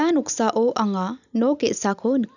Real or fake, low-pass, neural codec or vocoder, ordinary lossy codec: real; 7.2 kHz; none; none